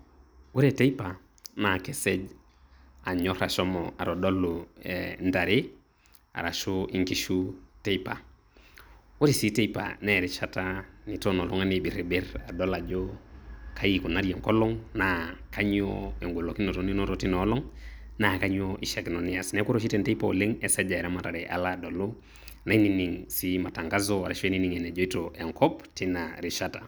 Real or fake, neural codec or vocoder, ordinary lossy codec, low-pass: real; none; none; none